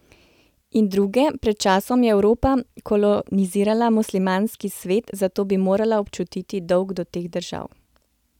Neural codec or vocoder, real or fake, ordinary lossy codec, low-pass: none; real; none; 19.8 kHz